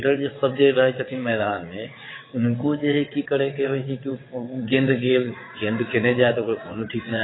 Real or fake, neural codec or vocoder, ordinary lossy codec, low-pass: fake; vocoder, 22.05 kHz, 80 mel bands, WaveNeXt; AAC, 16 kbps; 7.2 kHz